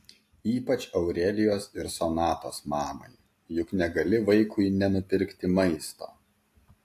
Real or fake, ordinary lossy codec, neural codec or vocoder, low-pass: real; AAC, 64 kbps; none; 14.4 kHz